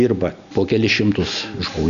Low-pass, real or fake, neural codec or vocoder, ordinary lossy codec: 7.2 kHz; real; none; Opus, 64 kbps